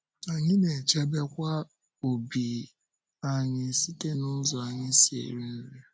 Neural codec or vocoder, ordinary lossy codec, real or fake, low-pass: none; none; real; none